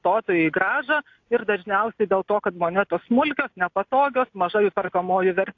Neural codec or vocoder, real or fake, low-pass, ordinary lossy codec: none; real; 7.2 kHz; AAC, 48 kbps